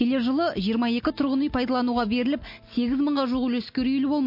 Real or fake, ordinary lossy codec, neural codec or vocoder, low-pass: real; MP3, 32 kbps; none; 5.4 kHz